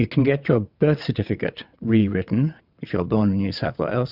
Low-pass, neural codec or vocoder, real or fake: 5.4 kHz; vocoder, 22.05 kHz, 80 mel bands, WaveNeXt; fake